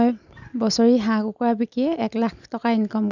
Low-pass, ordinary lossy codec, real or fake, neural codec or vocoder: 7.2 kHz; none; real; none